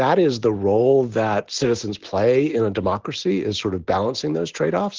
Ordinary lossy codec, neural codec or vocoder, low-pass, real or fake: Opus, 16 kbps; none; 7.2 kHz; real